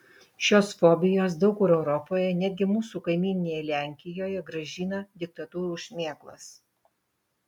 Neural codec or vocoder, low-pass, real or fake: none; 19.8 kHz; real